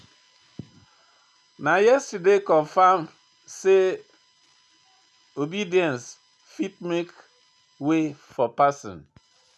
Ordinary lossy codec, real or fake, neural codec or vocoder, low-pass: none; real; none; none